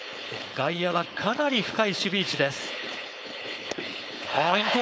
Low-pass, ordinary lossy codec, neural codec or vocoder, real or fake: none; none; codec, 16 kHz, 4.8 kbps, FACodec; fake